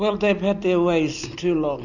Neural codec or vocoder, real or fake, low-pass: none; real; 7.2 kHz